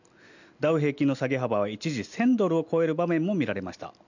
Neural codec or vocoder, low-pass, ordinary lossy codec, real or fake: none; 7.2 kHz; none; real